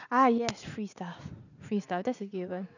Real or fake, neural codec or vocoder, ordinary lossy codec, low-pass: fake; vocoder, 44.1 kHz, 80 mel bands, Vocos; none; 7.2 kHz